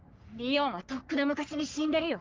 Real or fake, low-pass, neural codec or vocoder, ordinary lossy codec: fake; 7.2 kHz; codec, 44.1 kHz, 3.4 kbps, Pupu-Codec; Opus, 24 kbps